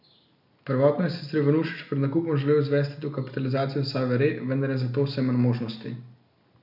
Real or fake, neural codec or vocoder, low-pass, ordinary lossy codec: real; none; 5.4 kHz; none